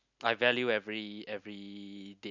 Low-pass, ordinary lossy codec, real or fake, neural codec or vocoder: 7.2 kHz; none; real; none